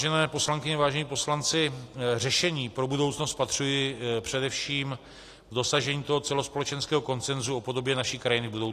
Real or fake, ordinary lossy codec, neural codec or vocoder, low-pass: real; AAC, 48 kbps; none; 14.4 kHz